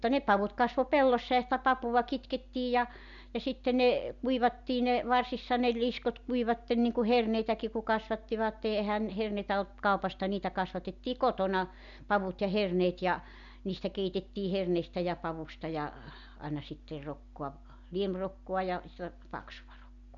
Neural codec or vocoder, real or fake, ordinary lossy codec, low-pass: none; real; none; 7.2 kHz